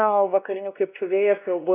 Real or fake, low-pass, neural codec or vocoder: fake; 3.6 kHz; codec, 16 kHz, 1 kbps, X-Codec, WavLM features, trained on Multilingual LibriSpeech